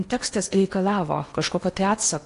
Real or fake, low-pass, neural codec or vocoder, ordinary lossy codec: fake; 10.8 kHz; codec, 16 kHz in and 24 kHz out, 0.8 kbps, FocalCodec, streaming, 65536 codes; AAC, 48 kbps